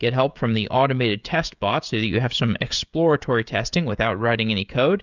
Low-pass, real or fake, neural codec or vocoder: 7.2 kHz; fake; codec, 16 kHz, 16 kbps, FreqCodec, smaller model